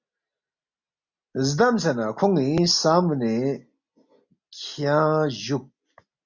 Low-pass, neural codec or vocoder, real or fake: 7.2 kHz; none; real